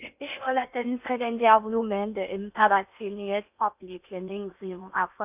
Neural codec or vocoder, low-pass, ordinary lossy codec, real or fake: codec, 16 kHz in and 24 kHz out, 0.8 kbps, FocalCodec, streaming, 65536 codes; 3.6 kHz; none; fake